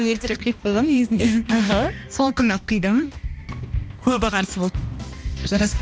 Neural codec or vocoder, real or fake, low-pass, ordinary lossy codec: codec, 16 kHz, 1 kbps, X-Codec, HuBERT features, trained on balanced general audio; fake; none; none